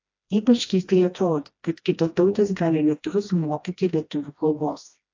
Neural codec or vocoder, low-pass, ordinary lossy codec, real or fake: codec, 16 kHz, 1 kbps, FreqCodec, smaller model; 7.2 kHz; AAC, 48 kbps; fake